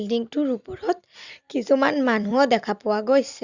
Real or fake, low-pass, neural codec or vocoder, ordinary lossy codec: fake; 7.2 kHz; vocoder, 44.1 kHz, 128 mel bands every 256 samples, BigVGAN v2; Opus, 64 kbps